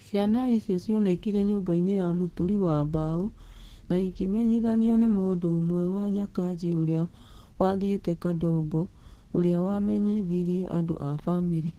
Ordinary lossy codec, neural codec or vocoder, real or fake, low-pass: Opus, 16 kbps; codec, 32 kHz, 1.9 kbps, SNAC; fake; 14.4 kHz